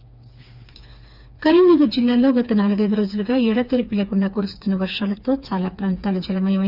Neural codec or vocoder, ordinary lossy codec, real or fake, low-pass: codec, 16 kHz, 4 kbps, FreqCodec, smaller model; Opus, 64 kbps; fake; 5.4 kHz